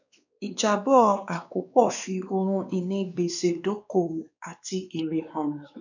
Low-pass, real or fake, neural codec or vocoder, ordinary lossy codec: 7.2 kHz; fake; codec, 16 kHz, 2 kbps, X-Codec, WavLM features, trained on Multilingual LibriSpeech; none